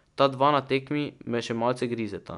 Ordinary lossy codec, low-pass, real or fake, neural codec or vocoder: none; 10.8 kHz; real; none